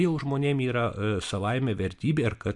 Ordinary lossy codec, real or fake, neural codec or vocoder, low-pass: MP3, 64 kbps; real; none; 10.8 kHz